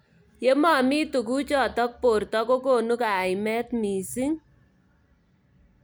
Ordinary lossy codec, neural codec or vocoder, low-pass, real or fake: none; none; none; real